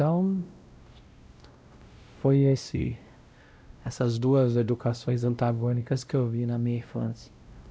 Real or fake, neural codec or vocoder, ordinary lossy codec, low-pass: fake; codec, 16 kHz, 0.5 kbps, X-Codec, WavLM features, trained on Multilingual LibriSpeech; none; none